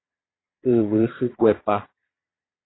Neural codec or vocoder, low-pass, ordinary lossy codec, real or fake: vocoder, 22.05 kHz, 80 mel bands, Vocos; 7.2 kHz; AAC, 16 kbps; fake